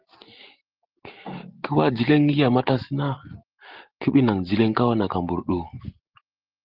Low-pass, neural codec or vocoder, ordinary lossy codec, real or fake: 5.4 kHz; none; Opus, 16 kbps; real